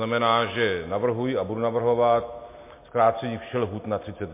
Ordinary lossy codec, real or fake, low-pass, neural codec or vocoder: MP3, 24 kbps; real; 3.6 kHz; none